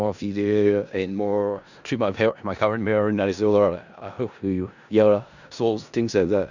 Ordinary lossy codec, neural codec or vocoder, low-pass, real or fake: none; codec, 16 kHz in and 24 kHz out, 0.4 kbps, LongCat-Audio-Codec, four codebook decoder; 7.2 kHz; fake